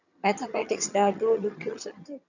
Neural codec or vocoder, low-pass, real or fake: vocoder, 22.05 kHz, 80 mel bands, HiFi-GAN; 7.2 kHz; fake